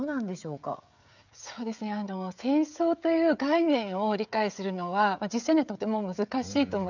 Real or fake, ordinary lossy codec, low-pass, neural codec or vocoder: fake; none; 7.2 kHz; codec, 16 kHz, 16 kbps, FreqCodec, smaller model